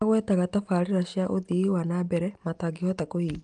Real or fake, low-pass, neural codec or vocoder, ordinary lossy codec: real; 9.9 kHz; none; Opus, 32 kbps